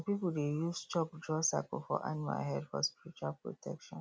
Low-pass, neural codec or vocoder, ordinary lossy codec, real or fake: none; none; none; real